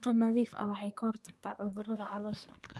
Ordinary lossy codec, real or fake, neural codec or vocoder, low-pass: none; fake; codec, 24 kHz, 1 kbps, SNAC; none